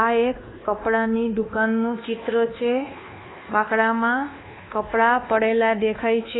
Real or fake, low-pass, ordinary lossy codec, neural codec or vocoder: fake; 7.2 kHz; AAC, 16 kbps; codec, 24 kHz, 1.2 kbps, DualCodec